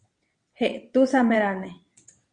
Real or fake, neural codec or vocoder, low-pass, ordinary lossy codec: fake; vocoder, 22.05 kHz, 80 mel bands, WaveNeXt; 9.9 kHz; Opus, 64 kbps